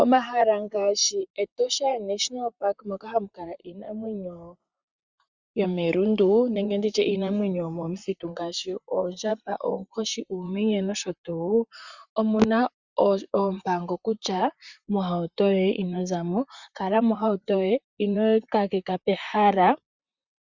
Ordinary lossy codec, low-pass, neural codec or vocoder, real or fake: Opus, 64 kbps; 7.2 kHz; vocoder, 44.1 kHz, 128 mel bands, Pupu-Vocoder; fake